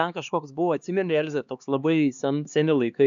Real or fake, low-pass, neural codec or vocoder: fake; 7.2 kHz; codec, 16 kHz, 2 kbps, X-Codec, HuBERT features, trained on LibriSpeech